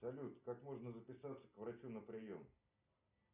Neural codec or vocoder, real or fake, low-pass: none; real; 3.6 kHz